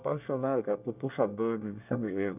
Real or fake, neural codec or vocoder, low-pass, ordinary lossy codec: fake; codec, 24 kHz, 1 kbps, SNAC; 3.6 kHz; none